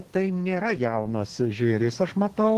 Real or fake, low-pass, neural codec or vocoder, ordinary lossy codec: fake; 14.4 kHz; codec, 32 kHz, 1.9 kbps, SNAC; Opus, 16 kbps